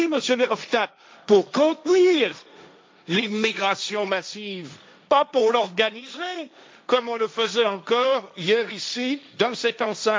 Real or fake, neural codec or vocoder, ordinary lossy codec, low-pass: fake; codec, 16 kHz, 1.1 kbps, Voila-Tokenizer; none; none